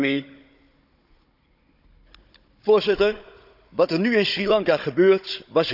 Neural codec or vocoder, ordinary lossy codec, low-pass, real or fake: codec, 16 kHz, 16 kbps, FunCodec, trained on Chinese and English, 50 frames a second; none; 5.4 kHz; fake